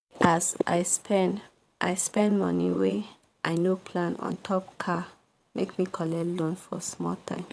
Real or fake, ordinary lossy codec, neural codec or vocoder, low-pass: fake; none; vocoder, 22.05 kHz, 80 mel bands, WaveNeXt; none